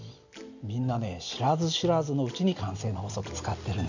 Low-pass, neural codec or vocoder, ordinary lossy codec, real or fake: 7.2 kHz; none; none; real